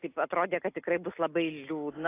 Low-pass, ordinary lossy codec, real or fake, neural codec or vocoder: 3.6 kHz; AAC, 24 kbps; real; none